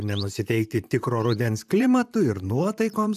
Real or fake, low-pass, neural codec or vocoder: fake; 14.4 kHz; vocoder, 44.1 kHz, 128 mel bands, Pupu-Vocoder